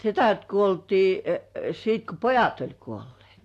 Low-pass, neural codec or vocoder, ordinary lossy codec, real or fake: 14.4 kHz; none; AAC, 64 kbps; real